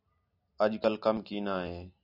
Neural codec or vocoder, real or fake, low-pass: none; real; 5.4 kHz